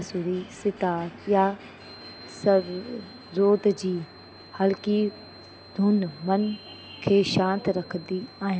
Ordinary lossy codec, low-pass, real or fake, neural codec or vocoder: none; none; real; none